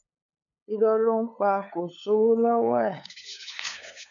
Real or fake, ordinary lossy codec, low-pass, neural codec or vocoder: fake; none; 7.2 kHz; codec, 16 kHz, 8 kbps, FunCodec, trained on LibriTTS, 25 frames a second